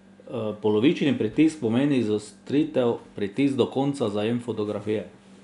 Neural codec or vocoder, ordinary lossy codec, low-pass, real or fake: none; MP3, 96 kbps; 10.8 kHz; real